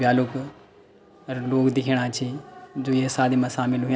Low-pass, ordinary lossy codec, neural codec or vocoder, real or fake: none; none; none; real